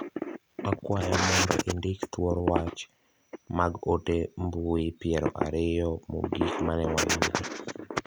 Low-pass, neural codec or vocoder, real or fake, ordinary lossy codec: none; none; real; none